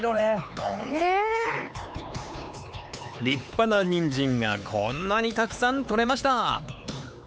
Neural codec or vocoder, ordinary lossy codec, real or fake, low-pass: codec, 16 kHz, 4 kbps, X-Codec, HuBERT features, trained on LibriSpeech; none; fake; none